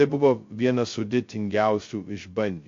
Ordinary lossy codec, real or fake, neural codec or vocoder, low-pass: AAC, 48 kbps; fake; codec, 16 kHz, 0.2 kbps, FocalCodec; 7.2 kHz